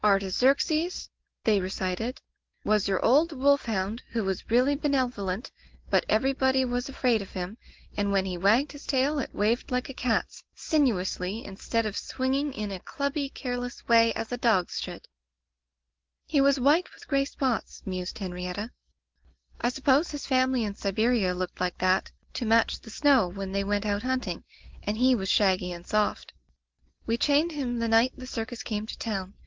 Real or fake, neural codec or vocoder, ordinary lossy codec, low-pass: real; none; Opus, 16 kbps; 7.2 kHz